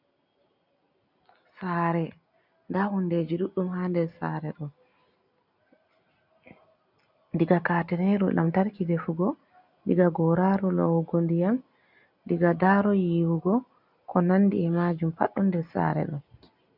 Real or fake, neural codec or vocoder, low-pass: real; none; 5.4 kHz